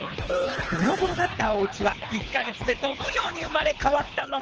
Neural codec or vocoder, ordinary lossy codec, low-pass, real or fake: codec, 24 kHz, 3 kbps, HILCodec; Opus, 16 kbps; 7.2 kHz; fake